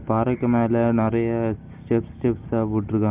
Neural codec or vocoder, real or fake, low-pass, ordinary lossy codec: none; real; 3.6 kHz; Opus, 32 kbps